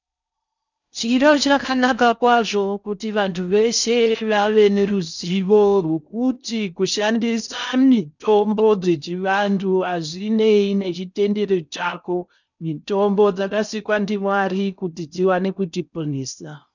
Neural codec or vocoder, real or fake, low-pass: codec, 16 kHz in and 24 kHz out, 0.6 kbps, FocalCodec, streaming, 4096 codes; fake; 7.2 kHz